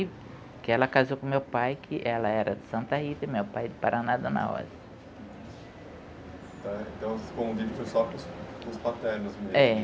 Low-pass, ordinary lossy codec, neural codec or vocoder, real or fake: none; none; none; real